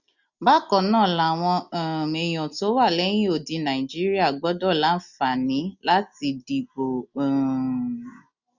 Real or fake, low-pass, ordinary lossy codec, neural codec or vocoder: real; 7.2 kHz; none; none